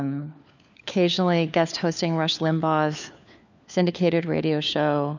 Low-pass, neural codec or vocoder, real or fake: 7.2 kHz; codec, 16 kHz, 4 kbps, FunCodec, trained on LibriTTS, 50 frames a second; fake